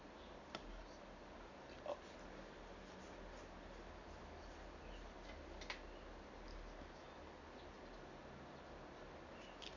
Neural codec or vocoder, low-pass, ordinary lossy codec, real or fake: none; 7.2 kHz; none; real